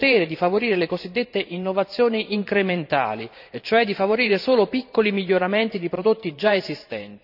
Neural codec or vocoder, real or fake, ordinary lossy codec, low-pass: vocoder, 44.1 kHz, 128 mel bands every 512 samples, BigVGAN v2; fake; none; 5.4 kHz